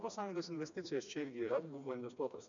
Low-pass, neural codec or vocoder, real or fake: 7.2 kHz; codec, 16 kHz, 2 kbps, FreqCodec, smaller model; fake